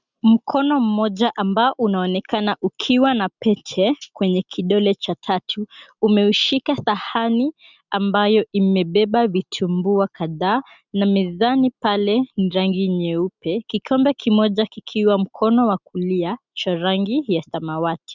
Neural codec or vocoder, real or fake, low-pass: none; real; 7.2 kHz